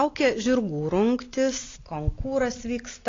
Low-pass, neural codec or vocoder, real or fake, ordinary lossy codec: 7.2 kHz; none; real; AAC, 32 kbps